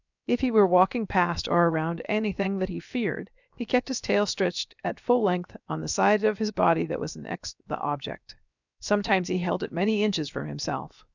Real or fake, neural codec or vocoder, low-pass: fake; codec, 16 kHz, 0.7 kbps, FocalCodec; 7.2 kHz